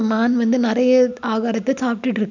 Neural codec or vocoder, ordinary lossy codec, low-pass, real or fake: none; none; 7.2 kHz; real